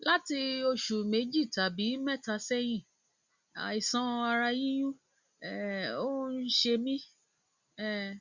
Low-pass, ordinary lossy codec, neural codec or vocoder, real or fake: 7.2 kHz; Opus, 64 kbps; none; real